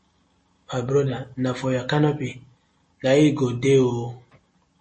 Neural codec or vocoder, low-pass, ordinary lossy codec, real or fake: none; 9.9 kHz; MP3, 32 kbps; real